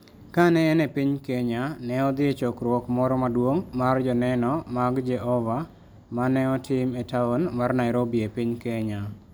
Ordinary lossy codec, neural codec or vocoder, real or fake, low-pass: none; none; real; none